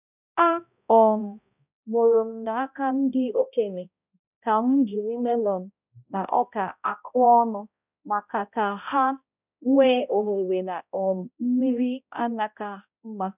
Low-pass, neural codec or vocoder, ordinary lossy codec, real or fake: 3.6 kHz; codec, 16 kHz, 0.5 kbps, X-Codec, HuBERT features, trained on balanced general audio; none; fake